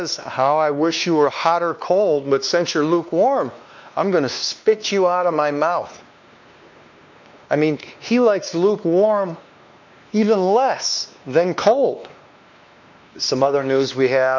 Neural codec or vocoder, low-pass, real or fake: codec, 16 kHz, 2 kbps, X-Codec, WavLM features, trained on Multilingual LibriSpeech; 7.2 kHz; fake